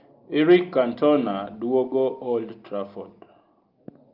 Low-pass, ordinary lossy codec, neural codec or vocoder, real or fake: 5.4 kHz; Opus, 32 kbps; none; real